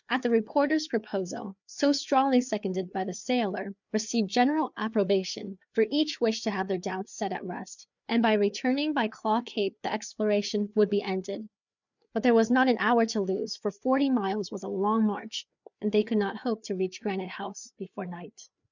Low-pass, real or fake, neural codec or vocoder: 7.2 kHz; fake; codec, 16 kHz, 4 kbps, FreqCodec, larger model